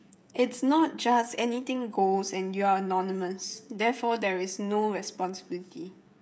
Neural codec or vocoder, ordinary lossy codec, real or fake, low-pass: codec, 16 kHz, 16 kbps, FreqCodec, smaller model; none; fake; none